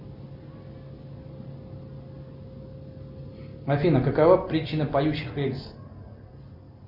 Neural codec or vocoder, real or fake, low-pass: none; real; 5.4 kHz